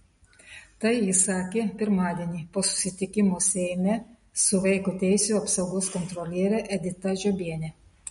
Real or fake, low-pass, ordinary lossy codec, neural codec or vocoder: real; 10.8 kHz; MP3, 48 kbps; none